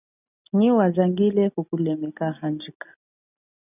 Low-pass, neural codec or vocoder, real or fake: 3.6 kHz; none; real